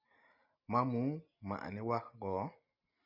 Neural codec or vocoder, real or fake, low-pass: none; real; 5.4 kHz